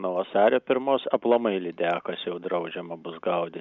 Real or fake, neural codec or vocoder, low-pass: real; none; 7.2 kHz